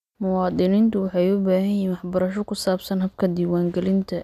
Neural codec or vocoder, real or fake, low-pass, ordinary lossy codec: none; real; 14.4 kHz; none